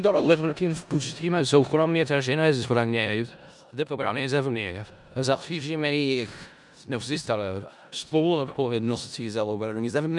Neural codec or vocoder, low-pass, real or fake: codec, 16 kHz in and 24 kHz out, 0.4 kbps, LongCat-Audio-Codec, four codebook decoder; 10.8 kHz; fake